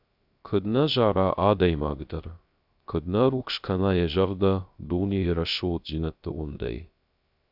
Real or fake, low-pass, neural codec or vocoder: fake; 5.4 kHz; codec, 16 kHz, 0.3 kbps, FocalCodec